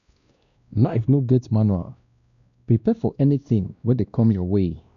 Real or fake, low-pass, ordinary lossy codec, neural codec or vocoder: fake; 7.2 kHz; none; codec, 16 kHz, 1 kbps, X-Codec, WavLM features, trained on Multilingual LibriSpeech